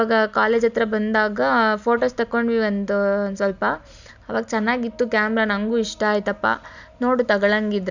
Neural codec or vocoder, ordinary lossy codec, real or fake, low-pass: none; none; real; 7.2 kHz